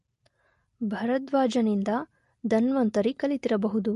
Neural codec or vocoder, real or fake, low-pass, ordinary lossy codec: none; real; 14.4 kHz; MP3, 48 kbps